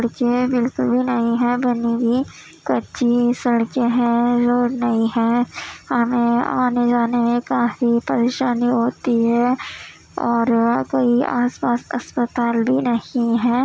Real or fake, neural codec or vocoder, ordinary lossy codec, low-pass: real; none; none; none